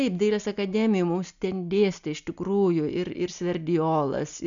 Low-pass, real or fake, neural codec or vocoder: 7.2 kHz; real; none